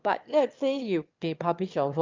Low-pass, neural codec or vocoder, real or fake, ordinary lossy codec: 7.2 kHz; autoencoder, 22.05 kHz, a latent of 192 numbers a frame, VITS, trained on one speaker; fake; Opus, 24 kbps